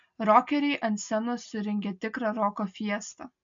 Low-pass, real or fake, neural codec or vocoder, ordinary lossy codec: 7.2 kHz; real; none; MP3, 48 kbps